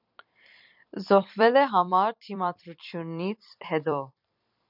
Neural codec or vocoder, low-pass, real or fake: vocoder, 44.1 kHz, 128 mel bands every 256 samples, BigVGAN v2; 5.4 kHz; fake